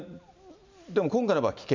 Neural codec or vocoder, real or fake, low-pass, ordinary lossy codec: none; real; 7.2 kHz; MP3, 64 kbps